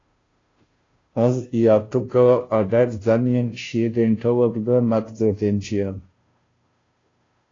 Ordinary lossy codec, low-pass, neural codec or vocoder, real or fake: AAC, 32 kbps; 7.2 kHz; codec, 16 kHz, 0.5 kbps, FunCodec, trained on Chinese and English, 25 frames a second; fake